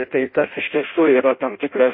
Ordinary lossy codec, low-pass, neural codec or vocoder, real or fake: MP3, 24 kbps; 5.4 kHz; codec, 16 kHz in and 24 kHz out, 0.6 kbps, FireRedTTS-2 codec; fake